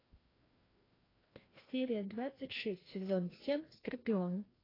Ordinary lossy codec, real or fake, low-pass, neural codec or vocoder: AAC, 24 kbps; fake; 5.4 kHz; codec, 16 kHz, 1 kbps, FreqCodec, larger model